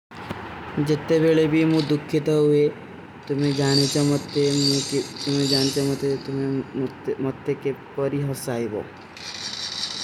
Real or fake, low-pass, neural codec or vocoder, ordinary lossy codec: real; 19.8 kHz; none; none